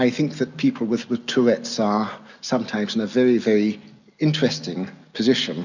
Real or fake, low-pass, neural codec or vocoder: real; 7.2 kHz; none